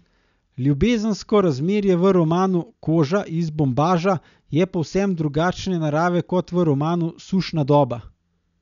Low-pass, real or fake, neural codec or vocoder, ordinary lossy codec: 7.2 kHz; real; none; none